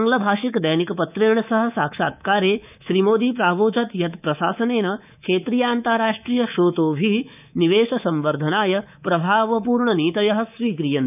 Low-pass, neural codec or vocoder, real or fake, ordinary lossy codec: 3.6 kHz; codec, 24 kHz, 3.1 kbps, DualCodec; fake; none